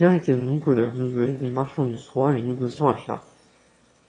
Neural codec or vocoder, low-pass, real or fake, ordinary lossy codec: autoencoder, 22.05 kHz, a latent of 192 numbers a frame, VITS, trained on one speaker; 9.9 kHz; fake; AAC, 32 kbps